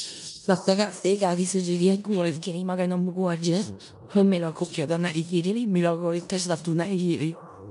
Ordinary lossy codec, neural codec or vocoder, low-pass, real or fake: none; codec, 16 kHz in and 24 kHz out, 0.4 kbps, LongCat-Audio-Codec, four codebook decoder; 10.8 kHz; fake